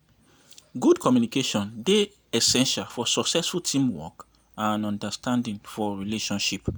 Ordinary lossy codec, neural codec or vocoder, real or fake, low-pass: none; none; real; none